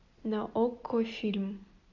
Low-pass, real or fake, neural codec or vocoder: 7.2 kHz; real; none